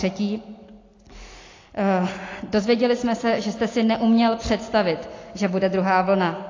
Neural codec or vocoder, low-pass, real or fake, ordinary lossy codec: none; 7.2 kHz; real; AAC, 32 kbps